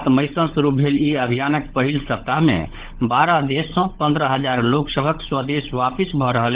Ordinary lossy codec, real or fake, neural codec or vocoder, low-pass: Opus, 16 kbps; fake; codec, 16 kHz, 16 kbps, FunCodec, trained on LibriTTS, 50 frames a second; 3.6 kHz